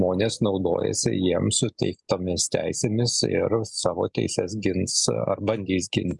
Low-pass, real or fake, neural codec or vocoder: 10.8 kHz; real; none